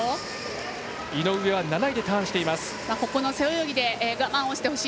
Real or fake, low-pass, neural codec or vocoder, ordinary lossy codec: real; none; none; none